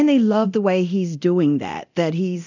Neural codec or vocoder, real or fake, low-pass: codec, 24 kHz, 0.9 kbps, DualCodec; fake; 7.2 kHz